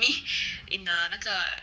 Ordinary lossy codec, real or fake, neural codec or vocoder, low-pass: none; fake; codec, 16 kHz, 4 kbps, X-Codec, HuBERT features, trained on general audio; none